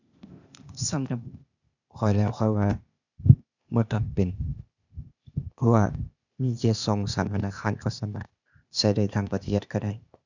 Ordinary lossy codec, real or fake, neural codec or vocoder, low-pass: none; fake; codec, 16 kHz, 0.8 kbps, ZipCodec; 7.2 kHz